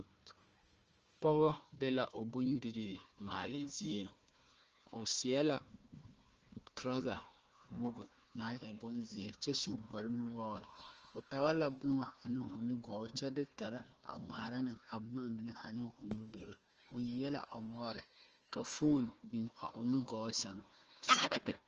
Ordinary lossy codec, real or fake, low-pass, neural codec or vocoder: Opus, 24 kbps; fake; 7.2 kHz; codec, 16 kHz, 1 kbps, FunCodec, trained on Chinese and English, 50 frames a second